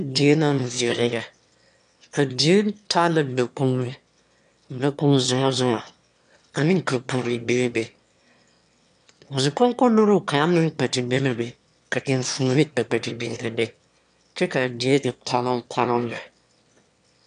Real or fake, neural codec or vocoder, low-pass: fake; autoencoder, 22.05 kHz, a latent of 192 numbers a frame, VITS, trained on one speaker; 9.9 kHz